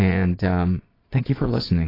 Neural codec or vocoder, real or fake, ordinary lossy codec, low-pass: vocoder, 22.05 kHz, 80 mel bands, Vocos; fake; AAC, 24 kbps; 5.4 kHz